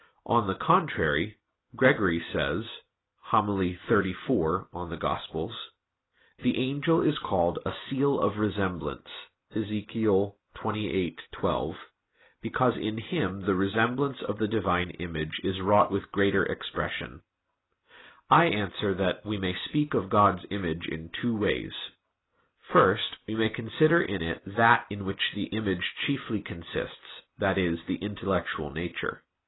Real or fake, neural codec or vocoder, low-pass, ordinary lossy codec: real; none; 7.2 kHz; AAC, 16 kbps